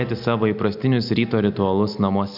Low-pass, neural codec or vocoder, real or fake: 5.4 kHz; none; real